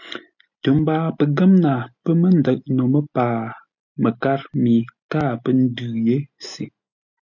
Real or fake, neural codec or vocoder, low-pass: real; none; 7.2 kHz